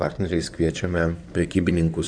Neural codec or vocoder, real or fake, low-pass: codec, 16 kHz in and 24 kHz out, 2.2 kbps, FireRedTTS-2 codec; fake; 9.9 kHz